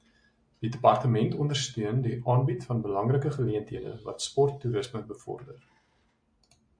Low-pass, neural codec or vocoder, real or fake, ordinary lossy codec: 9.9 kHz; none; real; MP3, 64 kbps